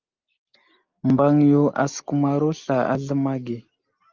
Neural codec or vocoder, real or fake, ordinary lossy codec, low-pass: none; real; Opus, 24 kbps; 7.2 kHz